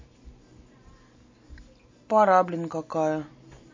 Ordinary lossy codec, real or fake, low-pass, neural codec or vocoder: MP3, 32 kbps; real; 7.2 kHz; none